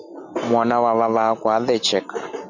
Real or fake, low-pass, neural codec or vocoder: real; 7.2 kHz; none